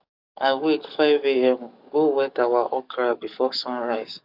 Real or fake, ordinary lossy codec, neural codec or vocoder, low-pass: fake; none; codec, 44.1 kHz, 7.8 kbps, DAC; 5.4 kHz